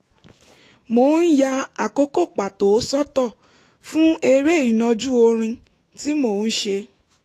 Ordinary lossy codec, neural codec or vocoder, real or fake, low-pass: AAC, 48 kbps; autoencoder, 48 kHz, 128 numbers a frame, DAC-VAE, trained on Japanese speech; fake; 14.4 kHz